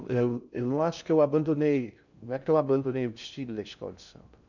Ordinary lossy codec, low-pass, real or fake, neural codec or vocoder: none; 7.2 kHz; fake; codec, 16 kHz in and 24 kHz out, 0.6 kbps, FocalCodec, streaming, 2048 codes